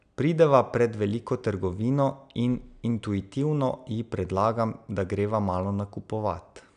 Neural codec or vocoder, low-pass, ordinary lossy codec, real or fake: none; 9.9 kHz; none; real